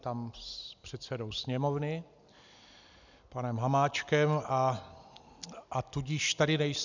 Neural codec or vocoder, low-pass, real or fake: none; 7.2 kHz; real